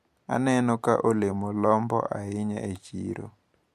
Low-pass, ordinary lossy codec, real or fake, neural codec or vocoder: 14.4 kHz; MP3, 64 kbps; real; none